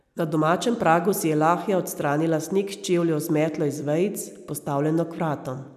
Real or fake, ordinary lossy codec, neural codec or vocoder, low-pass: real; none; none; 14.4 kHz